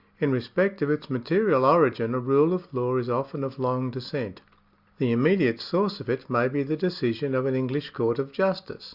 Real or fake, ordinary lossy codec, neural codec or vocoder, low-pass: real; Opus, 64 kbps; none; 5.4 kHz